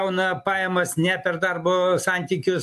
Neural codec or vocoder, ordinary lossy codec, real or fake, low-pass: none; AAC, 96 kbps; real; 14.4 kHz